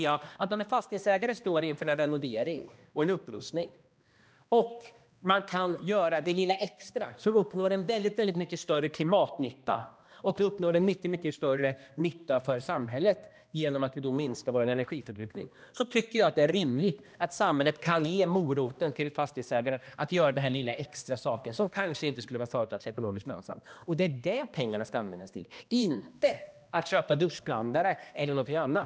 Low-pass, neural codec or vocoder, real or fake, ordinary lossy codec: none; codec, 16 kHz, 1 kbps, X-Codec, HuBERT features, trained on balanced general audio; fake; none